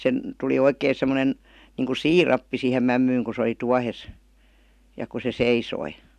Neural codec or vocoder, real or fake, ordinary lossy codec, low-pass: none; real; none; 14.4 kHz